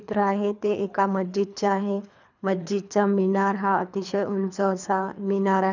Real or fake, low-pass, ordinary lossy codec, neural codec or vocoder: fake; 7.2 kHz; none; codec, 24 kHz, 3 kbps, HILCodec